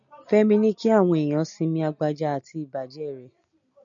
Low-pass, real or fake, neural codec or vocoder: 7.2 kHz; real; none